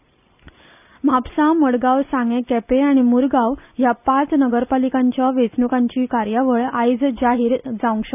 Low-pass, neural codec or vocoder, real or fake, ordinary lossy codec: 3.6 kHz; none; real; none